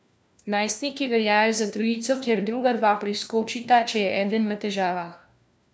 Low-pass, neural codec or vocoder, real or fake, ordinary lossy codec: none; codec, 16 kHz, 1 kbps, FunCodec, trained on LibriTTS, 50 frames a second; fake; none